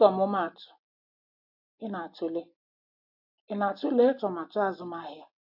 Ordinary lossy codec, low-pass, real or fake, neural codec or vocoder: AAC, 48 kbps; 5.4 kHz; real; none